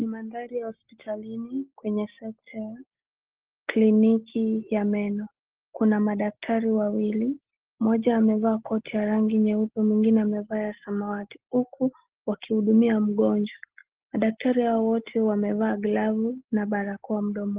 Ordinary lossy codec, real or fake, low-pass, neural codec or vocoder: Opus, 16 kbps; real; 3.6 kHz; none